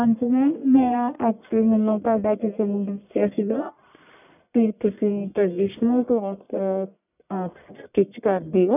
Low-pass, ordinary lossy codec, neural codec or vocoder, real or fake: 3.6 kHz; none; codec, 44.1 kHz, 1.7 kbps, Pupu-Codec; fake